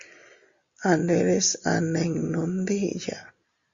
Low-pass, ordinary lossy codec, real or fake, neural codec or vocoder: 7.2 kHz; Opus, 64 kbps; real; none